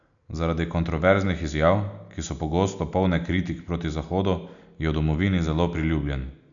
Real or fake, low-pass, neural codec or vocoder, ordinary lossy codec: real; 7.2 kHz; none; none